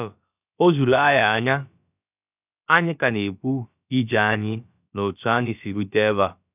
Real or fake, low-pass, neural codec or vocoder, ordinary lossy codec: fake; 3.6 kHz; codec, 16 kHz, about 1 kbps, DyCAST, with the encoder's durations; none